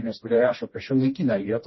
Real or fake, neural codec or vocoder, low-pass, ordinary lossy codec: fake; codec, 16 kHz, 1 kbps, FreqCodec, smaller model; 7.2 kHz; MP3, 24 kbps